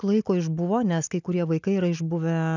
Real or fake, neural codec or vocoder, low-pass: real; none; 7.2 kHz